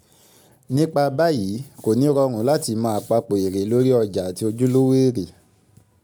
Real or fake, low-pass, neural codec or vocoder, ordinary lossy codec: real; none; none; none